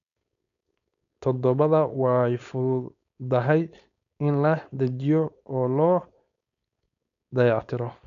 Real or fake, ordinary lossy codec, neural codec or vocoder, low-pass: fake; none; codec, 16 kHz, 4.8 kbps, FACodec; 7.2 kHz